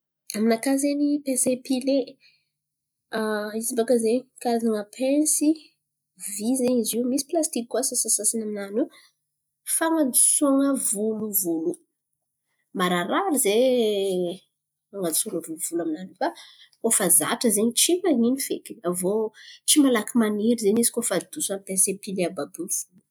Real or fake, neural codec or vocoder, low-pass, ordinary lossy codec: real; none; none; none